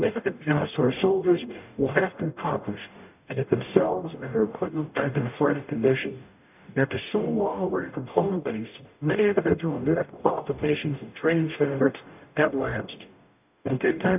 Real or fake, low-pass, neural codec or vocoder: fake; 3.6 kHz; codec, 44.1 kHz, 0.9 kbps, DAC